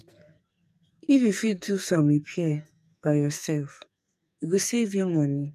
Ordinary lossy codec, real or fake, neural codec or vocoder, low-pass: none; fake; codec, 44.1 kHz, 2.6 kbps, SNAC; 14.4 kHz